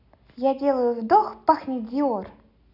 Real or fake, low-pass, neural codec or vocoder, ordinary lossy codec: real; 5.4 kHz; none; none